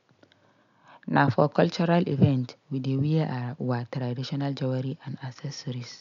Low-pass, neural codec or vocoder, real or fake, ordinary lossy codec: 7.2 kHz; none; real; none